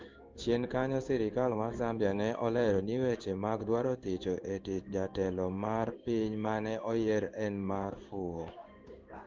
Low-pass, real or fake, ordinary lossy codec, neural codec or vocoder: 7.2 kHz; fake; Opus, 32 kbps; codec, 16 kHz in and 24 kHz out, 1 kbps, XY-Tokenizer